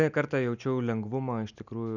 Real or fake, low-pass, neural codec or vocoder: real; 7.2 kHz; none